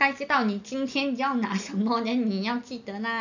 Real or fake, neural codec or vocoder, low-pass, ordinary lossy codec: real; none; 7.2 kHz; none